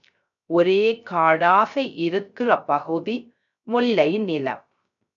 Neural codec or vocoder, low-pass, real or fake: codec, 16 kHz, 0.3 kbps, FocalCodec; 7.2 kHz; fake